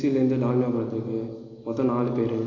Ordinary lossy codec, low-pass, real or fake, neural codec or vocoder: AAC, 32 kbps; 7.2 kHz; real; none